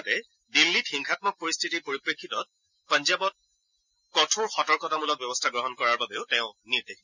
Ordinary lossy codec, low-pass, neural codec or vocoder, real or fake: none; 7.2 kHz; none; real